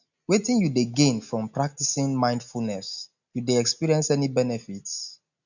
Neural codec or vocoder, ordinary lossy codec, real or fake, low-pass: none; none; real; 7.2 kHz